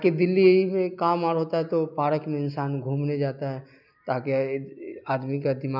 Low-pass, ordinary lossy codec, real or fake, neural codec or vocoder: 5.4 kHz; none; real; none